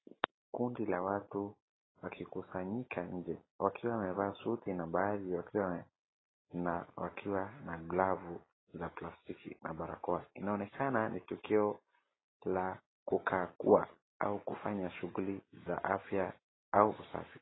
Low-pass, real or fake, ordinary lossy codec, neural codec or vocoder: 7.2 kHz; real; AAC, 16 kbps; none